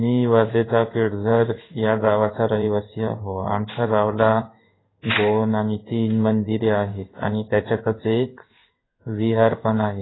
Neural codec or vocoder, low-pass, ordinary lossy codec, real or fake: codec, 16 kHz in and 24 kHz out, 1 kbps, XY-Tokenizer; 7.2 kHz; AAC, 16 kbps; fake